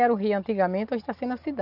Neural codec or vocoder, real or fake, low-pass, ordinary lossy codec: none; real; 5.4 kHz; none